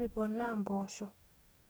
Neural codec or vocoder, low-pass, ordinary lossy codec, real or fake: codec, 44.1 kHz, 2.6 kbps, DAC; none; none; fake